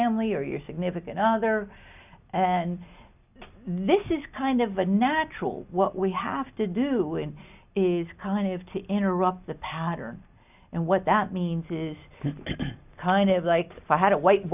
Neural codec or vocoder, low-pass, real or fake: none; 3.6 kHz; real